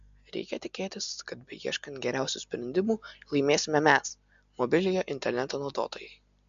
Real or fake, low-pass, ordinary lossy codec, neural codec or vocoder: real; 7.2 kHz; MP3, 64 kbps; none